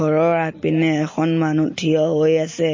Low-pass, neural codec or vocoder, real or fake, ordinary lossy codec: 7.2 kHz; none; real; MP3, 32 kbps